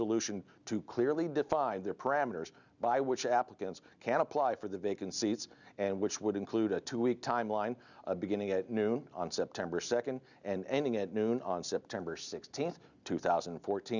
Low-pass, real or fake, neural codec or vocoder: 7.2 kHz; real; none